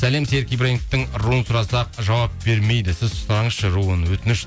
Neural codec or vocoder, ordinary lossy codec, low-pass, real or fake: none; none; none; real